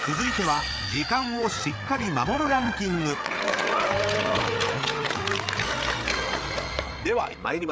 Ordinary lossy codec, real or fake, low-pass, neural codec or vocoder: none; fake; none; codec, 16 kHz, 8 kbps, FreqCodec, larger model